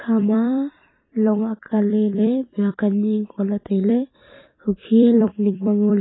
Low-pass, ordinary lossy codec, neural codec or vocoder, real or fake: 7.2 kHz; AAC, 16 kbps; vocoder, 44.1 kHz, 128 mel bands every 256 samples, BigVGAN v2; fake